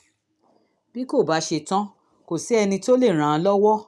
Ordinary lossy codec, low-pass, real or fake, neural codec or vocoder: none; none; real; none